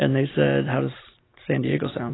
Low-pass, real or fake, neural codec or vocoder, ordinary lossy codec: 7.2 kHz; real; none; AAC, 16 kbps